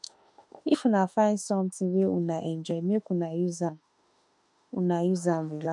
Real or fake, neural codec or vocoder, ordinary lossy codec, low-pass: fake; autoencoder, 48 kHz, 32 numbers a frame, DAC-VAE, trained on Japanese speech; none; 10.8 kHz